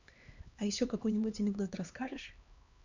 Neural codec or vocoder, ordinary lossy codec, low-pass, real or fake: codec, 16 kHz, 2 kbps, X-Codec, HuBERT features, trained on LibriSpeech; none; 7.2 kHz; fake